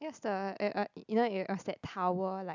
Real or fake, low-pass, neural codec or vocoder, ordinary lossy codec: real; 7.2 kHz; none; none